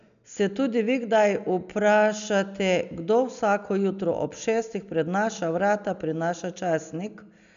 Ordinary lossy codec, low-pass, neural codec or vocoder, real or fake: none; 7.2 kHz; none; real